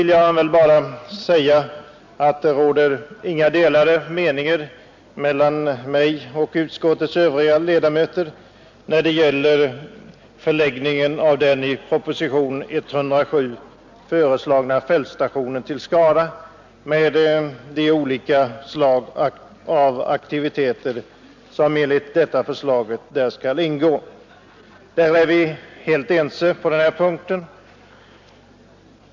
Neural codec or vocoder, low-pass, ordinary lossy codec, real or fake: none; 7.2 kHz; MP3, 64 kbps; real